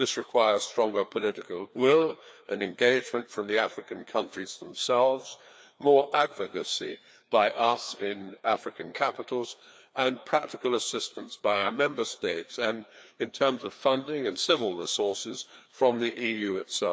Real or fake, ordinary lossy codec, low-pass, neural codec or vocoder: fake; none; none; codec, 16 kHz, 2 kbps, FreqCodec, larger model